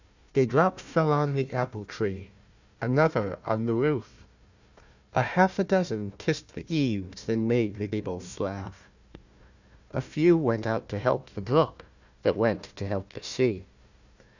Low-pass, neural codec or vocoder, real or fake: 7.2 kHz; codec, 16 kHz, 1 kbps, FunCodec, trained on Chinese and English, 50 frames a second; fake